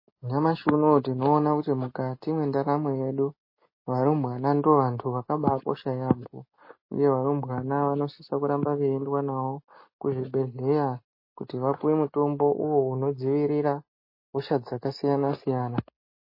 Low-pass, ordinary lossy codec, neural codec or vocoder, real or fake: 5.4 kHz; MP3, 24 kbps; none; real